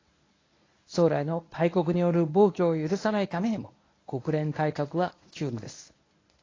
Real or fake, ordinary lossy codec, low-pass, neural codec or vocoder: fake; AAC, 32 kbps; 7.2 kHz; codec, 24 kHz, 0.9 kbps, WavTokenizer, medium speech release version 1